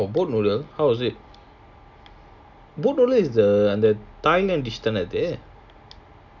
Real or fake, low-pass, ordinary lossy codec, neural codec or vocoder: real; 7.2 kHz; none; none